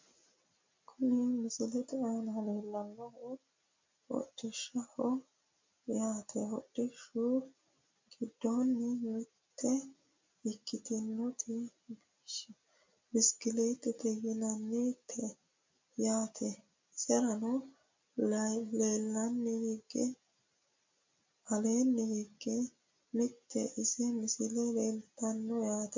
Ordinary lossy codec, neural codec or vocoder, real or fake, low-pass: MP3, 48 kbps; none; real; 7.2 kHz